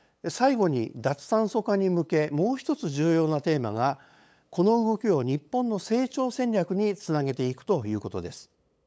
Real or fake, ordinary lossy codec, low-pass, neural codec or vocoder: fake; none; none; codec, 16 kHz, 8 kbps, FunCodec, trained on LibriTTS, 25 frames a second